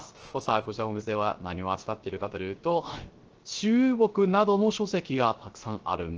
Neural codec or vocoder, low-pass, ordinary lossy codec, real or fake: codec, 16 kHz, 0.3 kbps, FocalCodec; 7.2 kHz; Opus, 16 kbps; fake